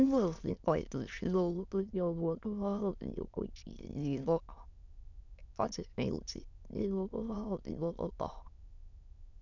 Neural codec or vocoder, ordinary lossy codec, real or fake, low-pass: autoencoder, 22.05 kHz, a latent of 192 numbers a frame, VITS, trained on many speakers; none; fake; 7.2 kHz